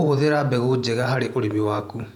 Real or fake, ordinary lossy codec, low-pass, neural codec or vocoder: fake; none; 19.8 kHz; vocoder, 48 kHz, 128 mel bands, Vocos